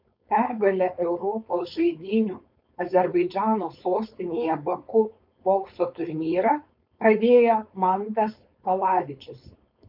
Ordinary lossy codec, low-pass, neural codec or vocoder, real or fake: MP3, 48 kbps; 5.4 kHz; codec, 16 kHz, 4.8 kbps, FACodec; fake